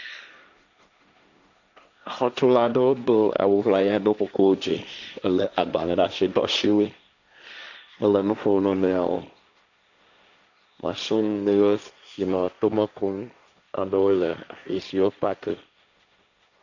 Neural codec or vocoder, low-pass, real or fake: codec, 16 kHz, 1.1 kbps, Voila-Tokenizer; 7.2 kHz; fake